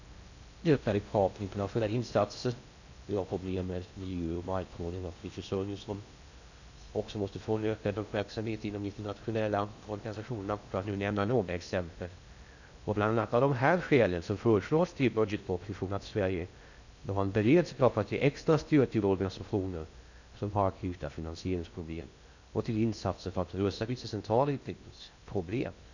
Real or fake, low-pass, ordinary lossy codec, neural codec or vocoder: fake; 7.2 kHz; none; codec, 16 kHz in and 24 kHz out, 0.6 kbps, FocalCodec, streaming, 4096 codes